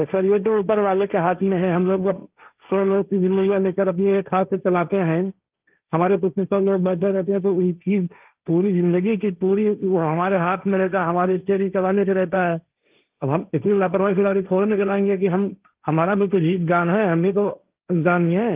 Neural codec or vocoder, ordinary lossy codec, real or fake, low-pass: codec, 16 kHz, 1.1 kbps, Voila-Tokenizer; Opus, 24 kbps; fake; 3.6 kHz